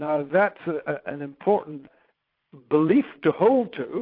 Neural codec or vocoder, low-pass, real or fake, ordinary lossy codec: vocoder, 22.05 kHz, 80 mel bands, Vocos; 5.4 kHz; fake; AAC, 32 kbps